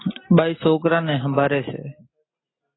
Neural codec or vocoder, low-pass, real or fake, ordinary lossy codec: none; 7.2 kHz; real; AAC, 16 kbps